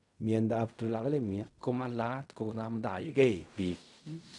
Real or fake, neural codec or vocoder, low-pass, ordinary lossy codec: fake; codec, 16 kHz in and 24 kHz out, 0.4 kbps, LongCat-Audio-Codec, fine tuned four codebook decoder; 10.8 kHz; none